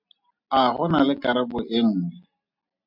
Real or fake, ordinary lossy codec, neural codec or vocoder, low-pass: real; MP3, 48 kbps; none; 5.4 kHz